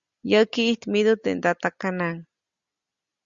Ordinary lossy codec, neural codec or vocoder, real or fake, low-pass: Opus, 64 kbps; none; real; 7.2 kHz